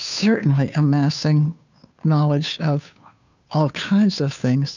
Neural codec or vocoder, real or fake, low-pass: codec, 16 kHz, 2 kbps, FunCodec, trained on Chinese and English, 25 frames a second; fake; 7.2 kHz